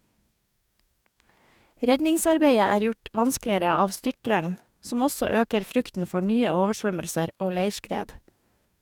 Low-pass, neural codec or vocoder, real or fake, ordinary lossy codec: 19.8 kHz; codec, 44.1 kHz, 2.6 kbps, DAC; fake; none